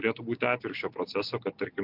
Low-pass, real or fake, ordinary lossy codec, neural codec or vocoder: 5.4 kHz; real; MP3, 48 kbps; none